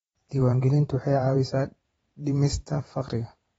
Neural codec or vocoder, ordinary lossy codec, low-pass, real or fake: vocoder, 44.1 kHz, 128 mel bands every 512 samples, BigVGAN v2; AAC, 24 kbps; 19.8 kHz; fake